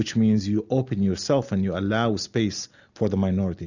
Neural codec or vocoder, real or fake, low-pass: none; real; 7.2 kHz